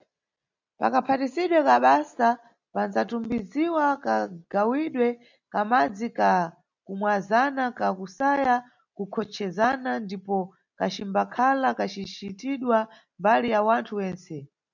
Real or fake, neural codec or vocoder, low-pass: real; none; 7.2 kHz